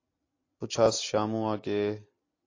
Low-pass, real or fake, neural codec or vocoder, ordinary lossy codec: 7.2 kHz; real; none; AAC, 32 kbps